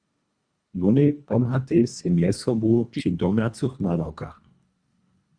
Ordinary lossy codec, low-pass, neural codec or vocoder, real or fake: Opus, 64 kbps; 9.9 kHz; codec, 24 kHz, 1.5 kbps, HILCodec; fake